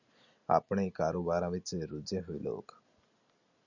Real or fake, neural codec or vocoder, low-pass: real; none; 7.2 kHz